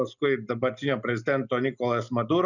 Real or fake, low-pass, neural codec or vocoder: real; 7.2 kHz; none